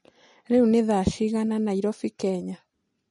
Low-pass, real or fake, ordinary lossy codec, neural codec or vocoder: 19.8 kHz; real; MP3, 48 kbps; none